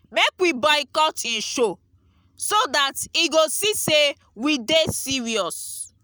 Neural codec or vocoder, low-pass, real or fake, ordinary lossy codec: none; none; real; none